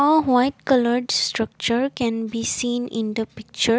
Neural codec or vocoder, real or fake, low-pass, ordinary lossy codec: none; real; none; none